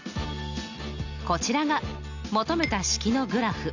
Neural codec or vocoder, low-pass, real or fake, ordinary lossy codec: none; 7.2 kHz; real; none